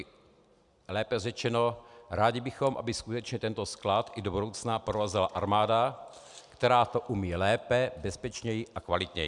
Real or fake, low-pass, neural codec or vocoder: real; 10.8 kHz; none